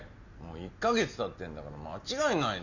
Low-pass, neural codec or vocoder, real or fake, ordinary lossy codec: 7.2 kHz; none; real; none